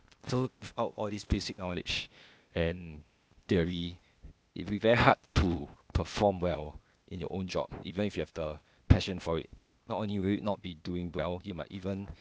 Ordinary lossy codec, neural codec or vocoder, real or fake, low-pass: none; codec, 16 kHz, 0.8 kbps, ZipCodec; fake; none